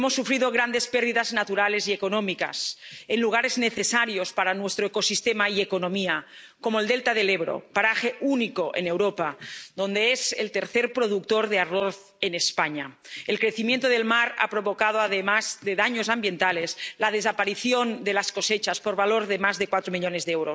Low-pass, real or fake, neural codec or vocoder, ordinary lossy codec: none; real; none; none